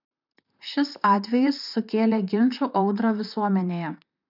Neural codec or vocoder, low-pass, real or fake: vocoder, 44.1 kHz, 128 mel bands, Pupu-Vocoder; 5.4 kHz; fake